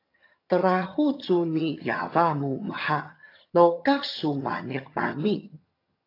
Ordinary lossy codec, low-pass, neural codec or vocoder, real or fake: AAC, 32 kbps; 5.4 kHz; vocoder, 22.05 kHz, 80 mel bands, HiFi-GAN; fake